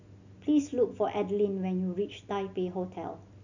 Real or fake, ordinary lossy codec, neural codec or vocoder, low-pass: real; none; none; 7.2 kHz